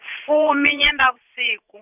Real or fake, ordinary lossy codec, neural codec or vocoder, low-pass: fake; none; vocoder, 44.1 kHz, 80 mel bands, Vocos; 3.6 kHz